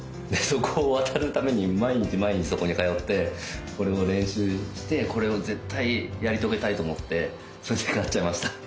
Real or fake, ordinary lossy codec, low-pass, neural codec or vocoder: real; none; none; none